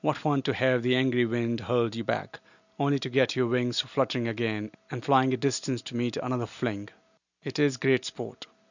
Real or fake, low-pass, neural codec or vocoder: real; 7.2 kHz; none